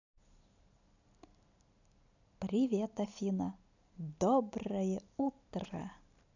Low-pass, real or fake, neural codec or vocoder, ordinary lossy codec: 7.2 kHz; real; none; none